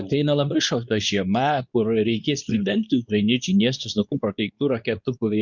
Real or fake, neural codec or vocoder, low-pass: fake; codec, 24 kHz, 0.9 kbps, WavTokenizer, medium speech release version 2; 7.2 kHz